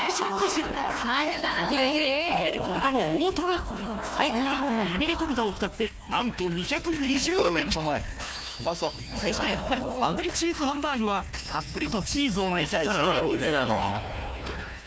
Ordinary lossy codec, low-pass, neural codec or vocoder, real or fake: none; none; codec, 16 kHz, 1 kbps, FunCodec, trained on Chinese and English, 50 frames a second; fake